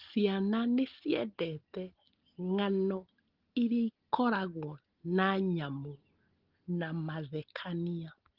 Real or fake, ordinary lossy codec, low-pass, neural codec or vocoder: real; Opus, 16 kbps; 5.4 kHz; none